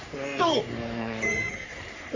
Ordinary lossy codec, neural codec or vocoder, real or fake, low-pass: AAC, 48 kbps; codec, 44.1 kHz, 3.4 kbps, Pupu-Codec; fake; 7.2 kHz